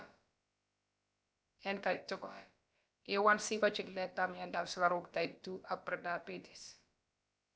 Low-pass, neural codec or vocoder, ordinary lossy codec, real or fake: none; codec, 16 kHz, about 1 kbps, DyCAST, with the encoder's durations; none; fake